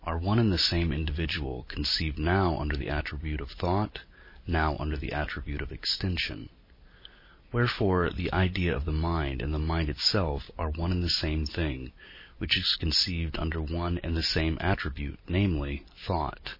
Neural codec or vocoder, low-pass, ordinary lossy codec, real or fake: none; 5.4 kHz; MP3, 24 kbps; real